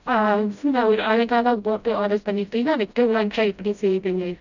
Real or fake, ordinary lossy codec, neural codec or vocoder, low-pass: fake; none; codec, 16 kHz, 0.5 kbps, FreqCodec, smaller model; 7.2 kHz